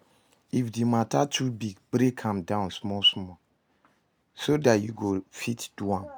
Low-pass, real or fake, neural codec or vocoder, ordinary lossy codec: none; real; none; none